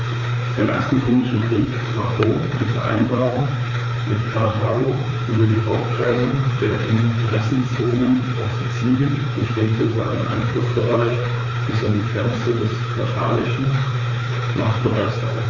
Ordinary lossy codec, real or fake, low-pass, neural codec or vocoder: Opus, 64 kbps; fake; 7.2 kHz; codec, 16 kHz, 4 kbps, FreqCodec, larger model